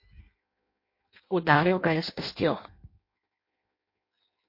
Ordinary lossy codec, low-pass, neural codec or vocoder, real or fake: MP3, 32 kbps; 5.4 kHz; codec, 16 kHz in and 24 kHz out, 0.6 kbps, FireRedTTS-2 codec; fake